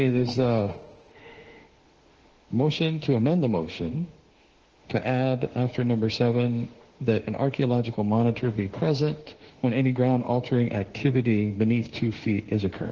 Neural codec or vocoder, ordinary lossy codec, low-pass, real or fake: autoencoder, 48 kHz, 32 numbers a frame, DAC-VAE, trained on Japanese speech; Opus, 16 kbps; 7.2 kHz; fake